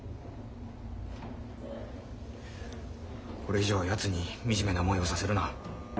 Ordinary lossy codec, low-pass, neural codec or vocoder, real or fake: none; none; none; real